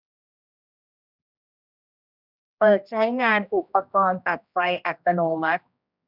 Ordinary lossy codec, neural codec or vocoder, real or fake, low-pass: none; codec, 16 kHz, 1 kbps, X-Codec, HuBERT features, trained on general audio; fake; 5.4 kHz